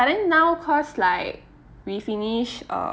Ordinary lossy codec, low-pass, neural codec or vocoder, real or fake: none; none; none; real